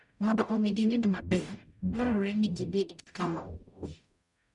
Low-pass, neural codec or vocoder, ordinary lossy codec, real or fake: 10.8 kHz; codec, 44.1 kHz, 0.9 kbps, DAC; MP3, 96 kbps; fake